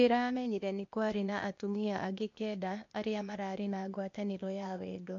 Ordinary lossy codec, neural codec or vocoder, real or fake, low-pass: MP3, 48 kbps; codec, 16 kHz, 0.8 kbps, ZipCodec; fake; 7.2 kHz